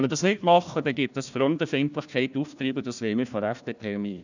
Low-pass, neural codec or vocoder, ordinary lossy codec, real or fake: 7.2 kHz; codec, 16 kHz, 1 kbps, FunCodec, trained on Chinese and English, 50 frames a second; none; fake